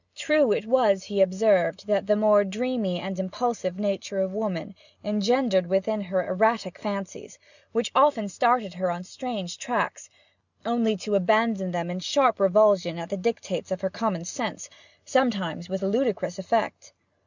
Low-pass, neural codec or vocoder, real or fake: 7.2 kHz; none; real